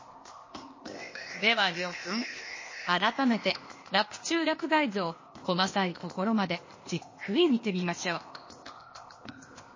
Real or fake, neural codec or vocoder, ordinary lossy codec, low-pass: fake; codec, 16 kHz, 0.8 kbps, ZipCodec; MP3, 32 kbps; 7.2 kHz